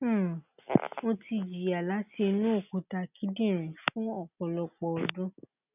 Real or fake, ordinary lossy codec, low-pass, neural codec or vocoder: real; none; 3.6 kHz; none